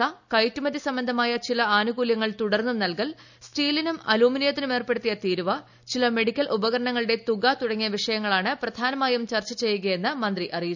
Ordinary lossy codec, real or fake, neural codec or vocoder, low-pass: none; real; none; 7.2 kHz